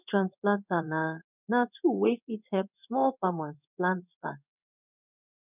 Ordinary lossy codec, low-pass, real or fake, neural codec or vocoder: none; 3.6 kHz; fake; codec, 16 kHz in and 24 kHz out, 1 kbps, XY-Tokenizer